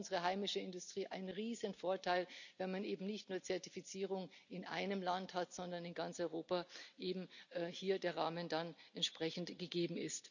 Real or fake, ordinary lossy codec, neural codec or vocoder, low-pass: real; none; none; 7.2 kHz